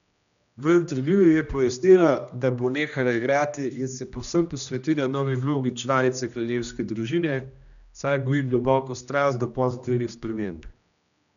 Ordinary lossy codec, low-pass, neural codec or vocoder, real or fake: none; 7.2 kHz; codec, 16 kHz, 1 kbps, X-Codec, HuBERT features, trained on general audio; fake